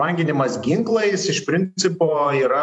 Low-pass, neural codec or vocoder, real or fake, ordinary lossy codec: 10.8 kHz; vocoder, 44.1 kHz, 128 mel bands every 512 samples, BigVGAN v2; fake; AAC, 64 kbps